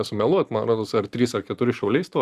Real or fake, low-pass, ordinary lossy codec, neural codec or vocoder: fake; 14.4 kHz; Opus, 64 kbps; vocoder, 44.1 kHz, 128 mel bands every 512 samples, BigVGAN v2